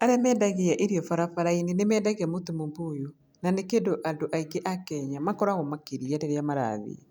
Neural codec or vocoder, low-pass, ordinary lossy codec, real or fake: none; none; none; real